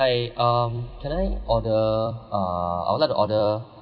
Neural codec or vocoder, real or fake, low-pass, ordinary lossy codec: vocoder, 44.1 kHz, 128 mel bands every 256 samples, BigVGAN v2; fake; 5.4 kHz; none